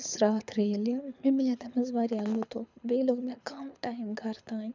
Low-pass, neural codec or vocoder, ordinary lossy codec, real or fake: 7.2 kHz; codec, 24 kHz, 6 kbps, HILCodec; none; fake